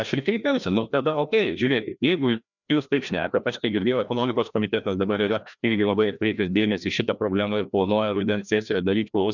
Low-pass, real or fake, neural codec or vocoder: 7.2 kHz; fake; codec, 16 kHz, 1 kbps, FreqCodec, larger model